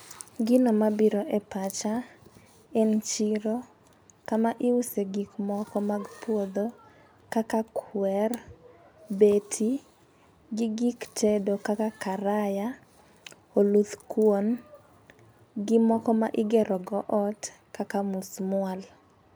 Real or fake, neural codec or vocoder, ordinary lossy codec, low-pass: real; none; none; none